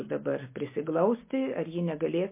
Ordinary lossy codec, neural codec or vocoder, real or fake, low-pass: MP3, 24 kbps; none; real; 3.6 kHz